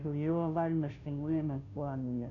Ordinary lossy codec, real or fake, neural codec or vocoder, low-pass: none; fake; codec, 16 kHz, 0.5 kbps, FunCodec, trained on Chinese and English, 25 frames a second; 7.2 kHz